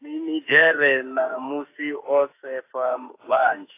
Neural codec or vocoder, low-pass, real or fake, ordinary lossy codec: autoencoder, 48 kHz, 32 numbers a frame, DAC-VAE, trained on Japanese speech; 3.6 kHz; fake; AAC, 24 kbps